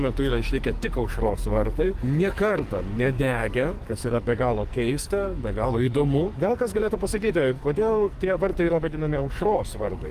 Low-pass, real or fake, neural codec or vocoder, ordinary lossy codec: 14.4 kHz; fake; codec, 44.1 kHz, 2.6 kbps, SNAC; Opus, 32 kbps